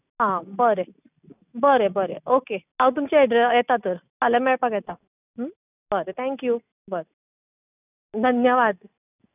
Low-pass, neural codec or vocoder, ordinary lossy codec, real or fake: 3.6 kHz; none; none; real